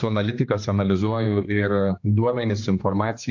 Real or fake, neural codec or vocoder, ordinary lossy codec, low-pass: fake; codec, 16 kHz, 2 kbps, X-Codec, HuBERT features, trained on general audio; AAC, 48 kbps; 7.2 kHz